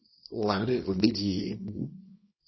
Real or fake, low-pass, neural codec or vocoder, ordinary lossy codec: fake; 7.2 kHz; codec, 24 kHz, 0.9 kbps, WavTokenizer, small release; MP3, 24 kbps